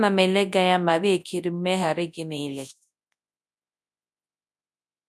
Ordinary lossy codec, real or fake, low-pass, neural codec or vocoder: none; fake; none; codec, 24 kHz, 0.9 kbps, WavTokenizer, large speech release